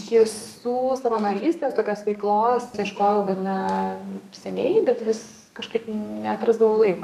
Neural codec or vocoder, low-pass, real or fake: codec, 32 kHz, 1.9 kbps, SNAC; 14.4 kHz; fake